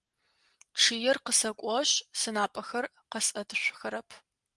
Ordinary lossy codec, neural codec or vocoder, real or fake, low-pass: Opus, 24 kbps; none; real; 10.8 kHz